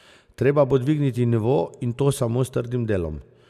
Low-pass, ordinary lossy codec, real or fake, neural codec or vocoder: 14.4 kHz; none; real; none